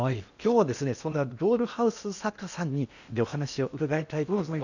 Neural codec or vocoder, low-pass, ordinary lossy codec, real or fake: codec, 16 kHz in and 24 kHz out, 0.8 kbps, FocalCodec, streaming, 65536 codes; 7.2 kHz; none; fake